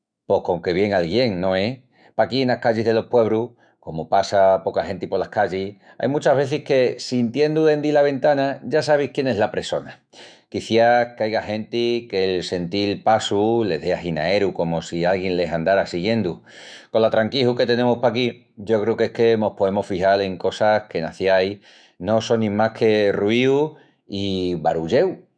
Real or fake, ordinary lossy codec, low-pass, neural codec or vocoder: fake; none; 9.9 kHz; autoencoder, 48 kHz, 128 numbers a frame, DAC-VAE, trained on Japanese speech